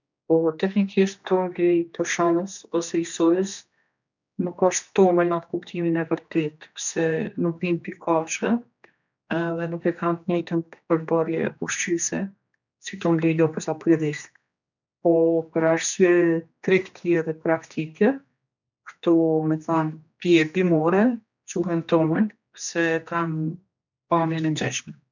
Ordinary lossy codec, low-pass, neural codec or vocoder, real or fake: none; 7.2 kHz; codec, 16 kHz, 2 kbps, X-Codec, HuBERT features, trained on general audio; fake